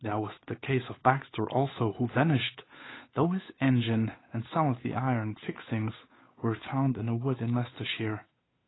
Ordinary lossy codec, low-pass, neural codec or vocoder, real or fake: AAC, 16 kbps; 7.2 kHz; codec, 16 kHz, 8 kbps, FunCodec, trained on Chinese and English, 25 frames a second; fake